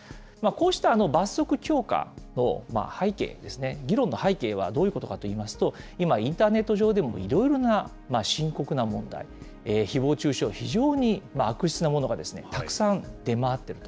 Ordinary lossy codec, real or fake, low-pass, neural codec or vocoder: none; real; none; none